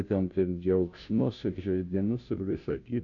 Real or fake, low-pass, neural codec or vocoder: fake; 7.2 kHz; codec, 16 kHz, 0.5 kbps, FunCodec, trained on Chinese and English, 25 frames a second